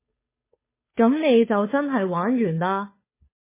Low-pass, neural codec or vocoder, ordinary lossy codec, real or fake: 3.6 kHz; codec, 16 kHz, 2 kbps, FunCodec, trained on Chinese and English, 25 frames a second; MP3, 16 kbps; fake